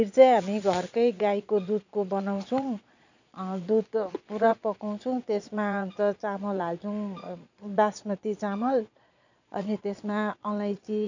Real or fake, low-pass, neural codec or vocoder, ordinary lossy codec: fake; 7.2 kHz; vocoder, 22.05 kHz, 80 mel bands, Vocos; none